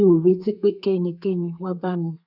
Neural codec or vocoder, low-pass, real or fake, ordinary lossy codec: codec, 32 kHz, 1.9 kbps, SNAC; 5.4 kHz; fake; none